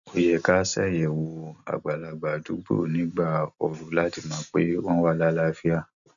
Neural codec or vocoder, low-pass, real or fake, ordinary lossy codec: none; 7.2 kHz; real; none